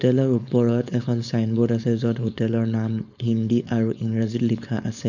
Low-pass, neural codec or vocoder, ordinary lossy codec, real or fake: 7.2 kHz; codec, 16 kHz, 4.8 kbps, FACodec; none; fake